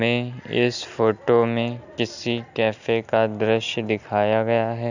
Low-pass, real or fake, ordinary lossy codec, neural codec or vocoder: 7.2 kHz; real; none; none